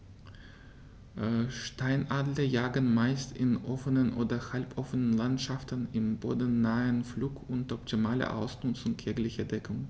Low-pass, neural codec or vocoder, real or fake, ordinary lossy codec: none; none; real; none